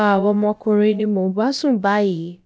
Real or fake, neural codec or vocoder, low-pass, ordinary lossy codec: fake; codec, 16 kHz, about 1 kbps, DyCAST, with the encoder's durations; none; none